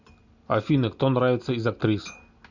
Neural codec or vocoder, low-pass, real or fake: none; 7.2 kHz; real